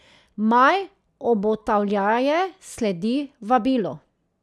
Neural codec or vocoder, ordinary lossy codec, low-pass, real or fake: none; none; none; real